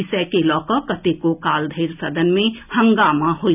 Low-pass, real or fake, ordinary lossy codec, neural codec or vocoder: 3.6 kHz; real; none; none